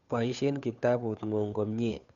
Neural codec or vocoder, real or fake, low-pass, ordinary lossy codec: codec, 16 kHz, 2 kbps, FunCodec, trained on Chinese and English, 25 frames a second; fake; 7.2 kHz; none